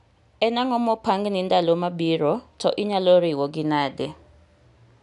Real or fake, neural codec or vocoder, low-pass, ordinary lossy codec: real; none; 10.8 kHz; none